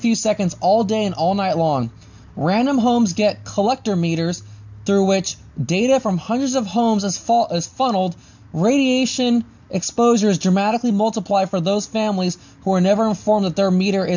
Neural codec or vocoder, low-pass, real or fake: none; 7.2 kHz; real